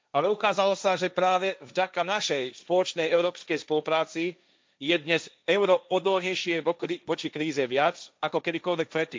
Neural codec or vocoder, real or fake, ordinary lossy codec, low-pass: codec, 16 kHz, 1.1 kbps, Voila-Tokenizer; fake; none; none